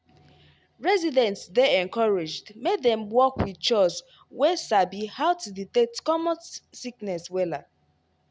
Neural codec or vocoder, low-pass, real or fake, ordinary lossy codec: none; none; real; none